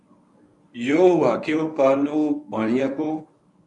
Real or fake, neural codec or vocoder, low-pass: fake; codec, 24 kHz, 0.9 kbps, WavTokenizer, medium speech release version 1; 10.8 kHz